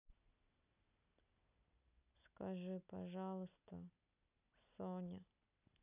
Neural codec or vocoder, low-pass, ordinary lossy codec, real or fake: none; 3.6 kHz; none; real